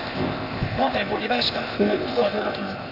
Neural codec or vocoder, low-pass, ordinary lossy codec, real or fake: codec, 16 kHz, 0.8 kbps, ZipCodec; 5.4 kHz; none; fake